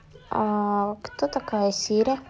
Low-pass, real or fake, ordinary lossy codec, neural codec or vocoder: none; real; none; none